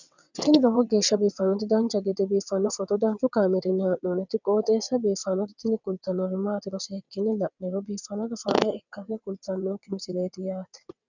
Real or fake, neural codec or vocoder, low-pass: fake; vocoder, 22.05 kHz, 80 mel bands, WaveNeXt; 7.2 kHz